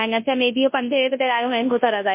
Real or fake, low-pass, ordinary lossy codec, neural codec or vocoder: fake; 3.6 kHz; MP3, 24 kbps; codec, 24 kHz, 0.9 kbps, WavTokenizer, large speech release